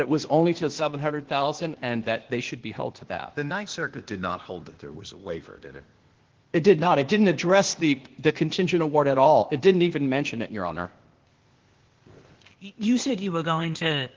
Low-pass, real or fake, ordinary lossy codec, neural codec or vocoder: 7.2 kHz; fake; Opus, 16 kbps; codec, 16 kHz, 0.8 kbps, ZipCodec